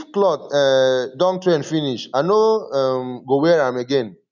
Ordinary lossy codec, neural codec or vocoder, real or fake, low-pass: none; none; real; 7.2 kHz